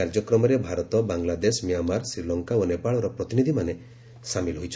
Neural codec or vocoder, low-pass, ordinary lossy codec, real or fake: none; none; none; real